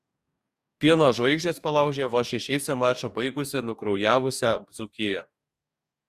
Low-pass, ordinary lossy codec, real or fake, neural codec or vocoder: 14.4 kHz; Opus, 64 kbps; fake; codec, 44.1 kHz, 2.6 kbps, DAC